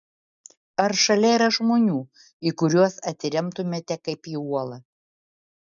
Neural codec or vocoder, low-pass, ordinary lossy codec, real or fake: none; 7.2 kHz; MP3, 96 kbps; real